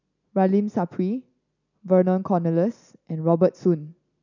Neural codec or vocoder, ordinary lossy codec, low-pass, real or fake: none; none; 7.2 kHz; real